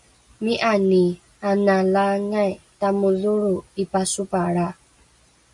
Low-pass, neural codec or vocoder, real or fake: 10.8 kHz; none; real